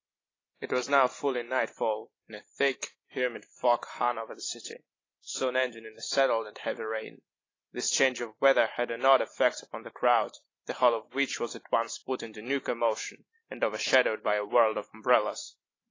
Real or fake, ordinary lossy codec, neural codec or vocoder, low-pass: real; AAC, 32 kbps; none; 7.2 kHz